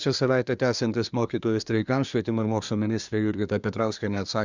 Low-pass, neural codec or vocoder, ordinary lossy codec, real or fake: 7.2 kHz; codec, 24 kHz, 1 kbps, SNAC; Opus, 64 kbps; fake